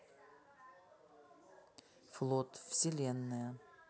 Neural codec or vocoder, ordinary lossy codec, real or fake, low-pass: none; none; real; none